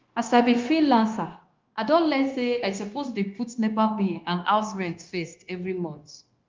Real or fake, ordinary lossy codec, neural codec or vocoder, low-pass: fake; Opus, 32 kbps; codec, 16 kHz, 0.9 kbps, LongCat-Audio-Codec; 7.2 kHz